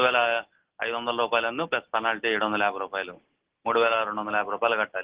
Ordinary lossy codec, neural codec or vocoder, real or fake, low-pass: Opus, 16 kbps; none; real; 3.6 kHz